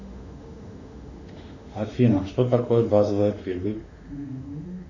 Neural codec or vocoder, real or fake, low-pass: autoencoder, 48 kHz, 32 numbers a frame, DAC-VAE, trained on Japanese speech; fake; 7.2 kHz